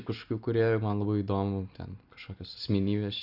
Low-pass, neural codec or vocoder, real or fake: 5.4 kHz; none; real